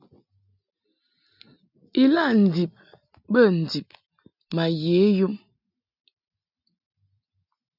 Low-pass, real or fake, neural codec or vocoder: 5.4 kHz; real; none